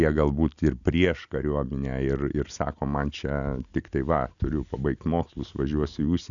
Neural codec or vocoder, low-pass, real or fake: none; 7.2 kHz; real